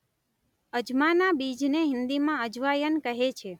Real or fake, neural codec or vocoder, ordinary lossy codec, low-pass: real; none; none; 19.8 kHz